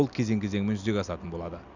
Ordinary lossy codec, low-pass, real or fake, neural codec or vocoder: none; 7.2 kHz; real; none